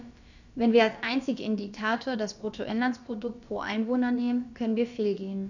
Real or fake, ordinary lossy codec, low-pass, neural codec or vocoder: fake; none; 7.2 kHz; codec, 16 kHz, about 1 kbps, DyCAST, with the encoder's durations